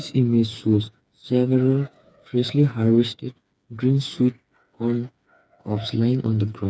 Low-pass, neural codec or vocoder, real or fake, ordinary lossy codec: none; codec, 16 kHz, 4 kbps, FreqCodec, smaller model; fake; none